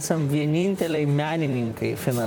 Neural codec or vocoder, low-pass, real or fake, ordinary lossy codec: vocoder, 44.1 kHz, 128 mel bands, Pupu-Vocoder; 19.8 kHz; fake; MP3, 96 kbps